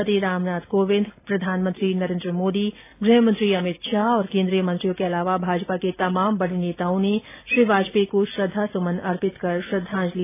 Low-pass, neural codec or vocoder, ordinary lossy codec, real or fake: 3.6 kHz; none; AAC, 32 kbps; real